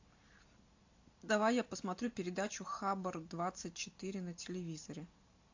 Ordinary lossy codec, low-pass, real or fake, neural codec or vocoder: AAC, 48 kbps; 7.2 kHz; real; none